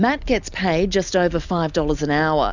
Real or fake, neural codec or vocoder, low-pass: real; none; 7.2 kHz